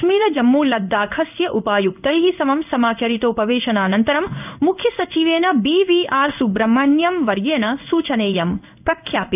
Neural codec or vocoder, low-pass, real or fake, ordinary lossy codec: codec, 16 kHz in and 24 kHz out, 1 kbps, XY-Tokenizer; 3.6 kHz; fake; none